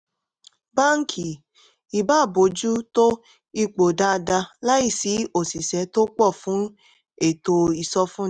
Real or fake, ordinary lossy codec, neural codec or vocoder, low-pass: real; none; none; 9.9 kHz